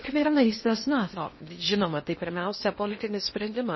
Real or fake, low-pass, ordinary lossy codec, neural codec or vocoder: fake; 7.2 kHz; MP3, 24 kbps; codec, 16 kHz in and 24 kHz out, 0.6 kbps, FocalCodec, streaming, 2048 codes